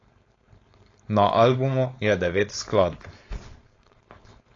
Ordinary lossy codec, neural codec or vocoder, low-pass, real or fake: AAC, 32 kbps; codec, 16 kHz, 4.8 kbps, FACodec; 7.2 kHz; fake